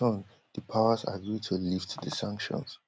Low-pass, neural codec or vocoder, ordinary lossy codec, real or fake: none; none; none; real